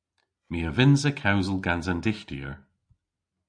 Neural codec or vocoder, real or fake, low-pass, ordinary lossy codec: none; real; 9.9 kHz; AAC, 64 kbps